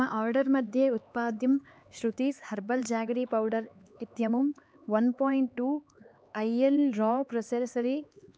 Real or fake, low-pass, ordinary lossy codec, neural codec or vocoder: fake; none; none; codec, 16 kHz, 4 kbps, X-Codec, HuBERT features, trained on LibriSpeech